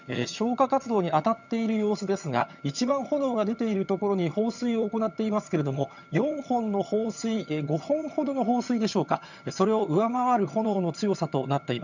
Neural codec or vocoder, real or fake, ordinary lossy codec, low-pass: vocoder, 22.05 kHz, 80 mel bands, HiFi-GAN; fake; none; 7.2 kHz